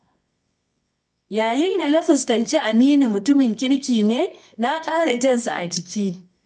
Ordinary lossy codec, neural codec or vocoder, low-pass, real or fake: none; codec, 24 kHz, 0.9 kbps, WavTokenizer, medium music audio release; none; fake